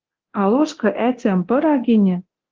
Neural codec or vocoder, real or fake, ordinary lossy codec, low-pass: codec, 24 kHz, 0.9 kbps, DualCodec; fake; Opus, 16 kbps; 7.2 kHz